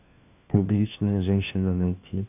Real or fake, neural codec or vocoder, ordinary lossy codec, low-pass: fake; codec, 16 kHz, 1 kbps, FunCodec, trained on LibriTTS, 50 frames a second; AAC, 32 kbps; 3.6 kHz